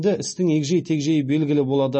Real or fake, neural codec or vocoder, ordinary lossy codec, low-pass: real; none; MP3, 32 kbps; 9.9 kHz